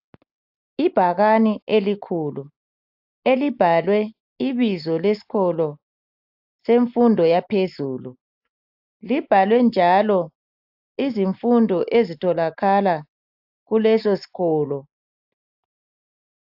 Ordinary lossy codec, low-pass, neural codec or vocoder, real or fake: AAC, 48 kbps; 5.4 kHz; none; real